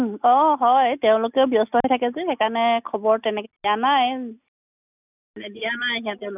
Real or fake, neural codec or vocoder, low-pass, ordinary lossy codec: real; none; 3.6 kHz; none